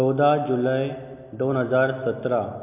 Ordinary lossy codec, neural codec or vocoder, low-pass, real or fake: MP3, 32 kbps; none; 3.6 kHz; real